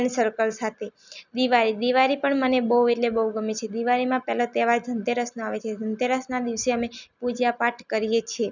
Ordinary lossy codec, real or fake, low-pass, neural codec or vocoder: none; real; 7.2 kHz; none